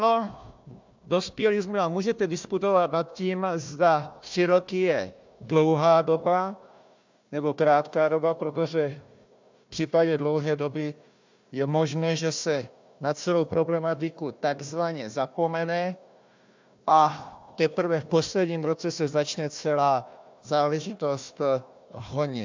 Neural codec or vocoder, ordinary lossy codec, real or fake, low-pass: codec, 16 kHz, 1 kbps, FunCodec, trained on Chinese and English, 50 frames a second; MP3, 64 kbps; fake; 7.2 kHz